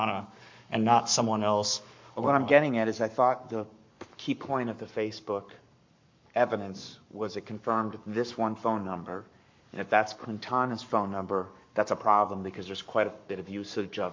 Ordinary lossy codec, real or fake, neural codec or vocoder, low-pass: MP3, 48 kbps; fake; codec, 44.1 kHz, 7.8 kbps, Pupu-Codec; 7.2 kHz